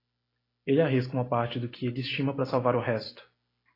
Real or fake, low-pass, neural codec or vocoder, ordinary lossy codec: real; 5.4 kHz; none; AAC, 24 kbps